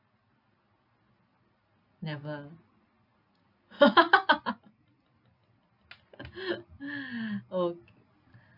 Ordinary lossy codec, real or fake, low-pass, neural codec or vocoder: none; real; 5.4 kHz; none